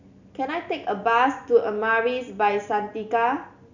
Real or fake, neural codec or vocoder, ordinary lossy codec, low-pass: real; none; none; 7.2 kHz